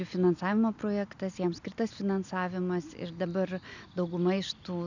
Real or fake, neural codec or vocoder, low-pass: real; none; 7.2 kHz